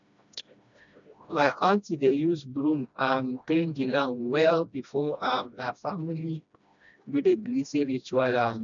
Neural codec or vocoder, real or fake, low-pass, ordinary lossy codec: codec, 16 kHz, 1 kbps, FreqCodec, smaller model; fake; 7.2 kHz; none